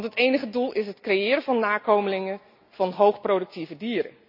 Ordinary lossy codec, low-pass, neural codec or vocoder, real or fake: AAC, 48 kbps; 5.4 kHz; none; real